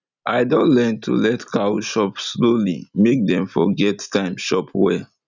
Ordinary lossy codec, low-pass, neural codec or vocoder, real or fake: none; 7.2 kHz; none; real